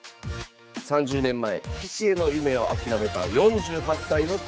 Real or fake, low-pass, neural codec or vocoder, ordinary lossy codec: fake; none; codec, 16 kHz, 4 kbps, X-Codec, HuBERT features, trained on general audio; none